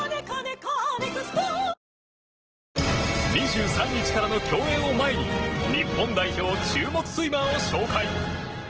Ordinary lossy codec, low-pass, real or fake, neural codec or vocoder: Opus, 16 kbps; 7.2 kHz; real; none